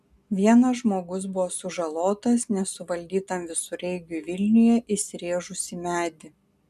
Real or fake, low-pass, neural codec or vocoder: real; 14.4 kHz; none